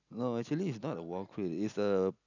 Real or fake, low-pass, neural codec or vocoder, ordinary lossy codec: real; 7.2 kHz; none; none